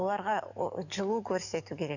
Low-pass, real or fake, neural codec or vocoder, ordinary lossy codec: 7.2 kHz; fake; codec, 44.1 kHz, 7.8 kbps, DAC; none